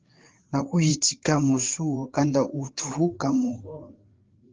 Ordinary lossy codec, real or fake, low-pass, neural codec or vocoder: Opus, 16 kbps; fake; 7.2 kHz; codec, 16 kHz, 4 kbps, FreqCodec, larger model